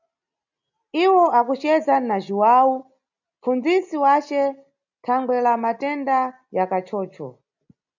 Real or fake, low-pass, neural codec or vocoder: real; 7.2 kHz; none